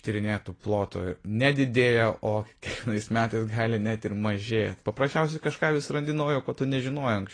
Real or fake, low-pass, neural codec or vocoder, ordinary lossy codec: real; 9.9 kHz; none; AAC, 32 kbps